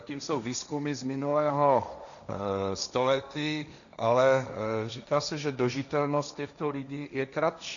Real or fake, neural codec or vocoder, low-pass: fake; codec, 16 kHz, 1.1 kbps, Voila-Tokenizer; 7.2 kHz